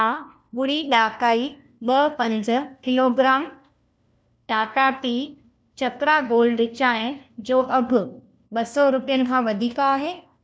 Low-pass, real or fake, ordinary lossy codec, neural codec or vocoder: none; fake; none; codec, 16 kHz, 1 kbps, FunCodec, trained on LibriTTS, 50 frames a second